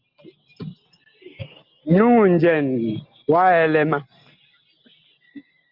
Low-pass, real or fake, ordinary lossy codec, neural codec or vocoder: 5.4 kHz; real; Opus, 32 kbps; none